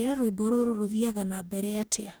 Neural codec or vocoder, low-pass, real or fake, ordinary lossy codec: codec, 44.1 kHz, 2.6 kbps, DAC; none; fake; none